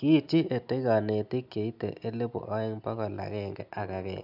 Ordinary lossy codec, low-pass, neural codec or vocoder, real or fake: none; 5.4 kHz; none; real